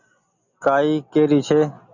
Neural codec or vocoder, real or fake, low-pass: none; real; 7.2 kHz